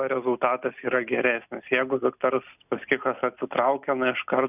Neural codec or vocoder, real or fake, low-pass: none; real; 3.6 kHz